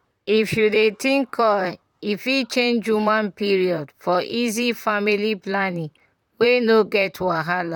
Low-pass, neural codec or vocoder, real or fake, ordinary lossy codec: 19.8 kHz; vocoder, 44.1 kHz, 128 mel bands, Pupu-Vocoder; fake; none